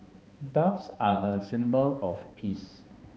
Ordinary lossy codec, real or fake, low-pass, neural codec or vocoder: none; fake; none; codec, 16 kHz, 2 kbps, X-Codec, HuBERT features, trained on balanced general audio